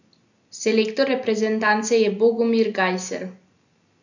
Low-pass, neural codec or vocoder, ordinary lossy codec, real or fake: 7.2 kHz; none; none; real